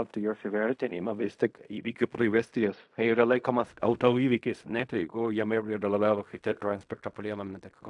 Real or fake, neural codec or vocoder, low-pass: fake; codec, 16 kHz in and 24 kHz out, 0.4 kbps, LongCat-Audio-Codec, fine tuned four codebook decoder; 10.8 kHz